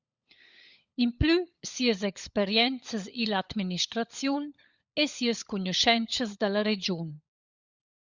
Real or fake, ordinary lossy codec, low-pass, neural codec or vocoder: fake; Opus, 64 kbps; 7.2 kHz; codec, 16 kHz, 16 kbps, FunCodec, trained on LibriTTS, 50 frames a second